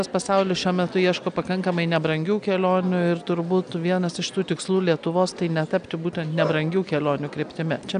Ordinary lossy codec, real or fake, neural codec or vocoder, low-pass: AAC, 96 kbps; real; none; 9.9 kHz